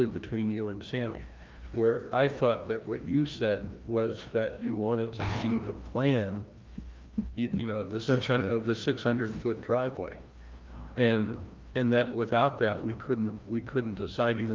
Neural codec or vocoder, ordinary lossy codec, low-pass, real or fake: codec, 16 kHz, 1 kbps, FreqCodec, larger model; Opus, 24 kbps; 7.2 kHz; fake